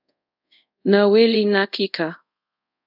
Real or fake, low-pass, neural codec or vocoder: fake; 5.4 kHz; codec, 24 kHz, 0.5 kbps, DualCodec